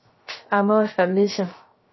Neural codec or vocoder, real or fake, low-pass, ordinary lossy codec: codec, 16 kHz, 0.3 kbps, FocalCodec; fake; 7.2 kHz; MP3, 24 kbps